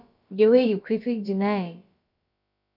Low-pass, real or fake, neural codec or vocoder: 5.4 kHz; fake; codec, 16 kHz, about 1 kbps, DyCAST, with the encoder's durations